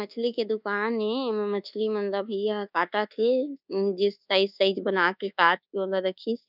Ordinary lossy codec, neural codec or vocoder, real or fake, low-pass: none; codec, 24 kHz, 1.2 kbps, DualCodec; fake; 5.4 kHz